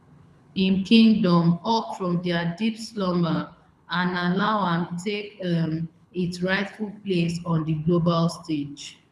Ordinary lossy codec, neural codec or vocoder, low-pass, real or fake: none; codec, 24 kHz, 6 kbps, HILCodec; none; fake